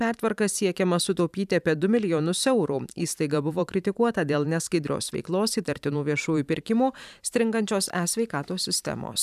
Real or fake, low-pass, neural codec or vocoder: fake; 14.4 kHz; vocoder, 44.1 kHz, 128 mel bands every 512 samples, BigVGAN v2